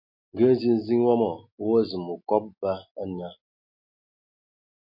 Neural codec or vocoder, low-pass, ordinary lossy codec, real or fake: none; 5.4 kHz; MP3, 32 kbps; real